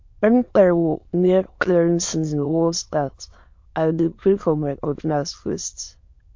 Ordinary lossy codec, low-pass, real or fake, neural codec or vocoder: MP3, 48 kbps; 7.2 kHz; fake; autoencoder, 22.05 kHz, a latent of 192 numbers a frame, VITS, trained on many speakers